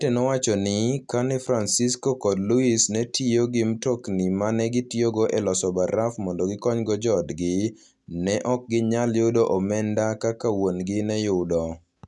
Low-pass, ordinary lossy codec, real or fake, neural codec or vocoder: 10.8 kHz; none; real; none